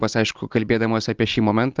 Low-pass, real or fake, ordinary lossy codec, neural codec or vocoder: 7.2 kHz; real; Opus, 24 kbps; none